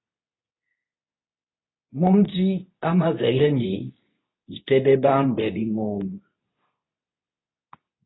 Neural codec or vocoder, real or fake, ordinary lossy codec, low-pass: codec, 24 kHz, 0.9 kbps, WavTokenizer, medium speech release version 2; fake; AAC, 16 kbps; 7.2 kHz